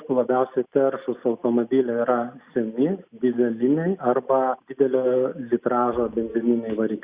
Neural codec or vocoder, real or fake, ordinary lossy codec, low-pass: none; real; Opus, 64 kbps; 3.6 kHz